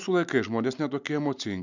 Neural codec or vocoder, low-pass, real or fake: none; 7.2 kHz; real